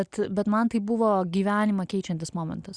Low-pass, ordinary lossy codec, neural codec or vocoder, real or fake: 9.9 kHz; Opus, 64 kbps; none; real